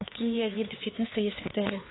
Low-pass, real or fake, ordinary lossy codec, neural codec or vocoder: 7.2 kHz; fake; AAC, 16 kbps; codec, 16 kHz, 4 kbps, X-Codec, HuBERT features, trained on LibriSpeech